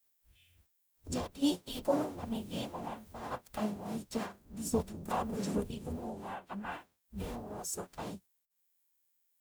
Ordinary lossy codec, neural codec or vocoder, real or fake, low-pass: none; codec, 44.1 kHz, 0.9 kbps, DAC; fake; none